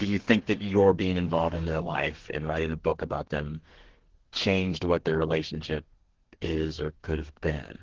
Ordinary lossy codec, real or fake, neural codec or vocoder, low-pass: Opus, 16 kbps; fake; codec, 32 kHz, 1.9 kbps, SNAC; 7.2 kHz